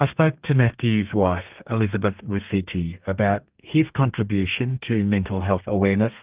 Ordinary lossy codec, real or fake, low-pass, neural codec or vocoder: Opus, 24 kbps; fake; 3.6 kHz; codec, 32 kHz, 1.9 kbps, SNAC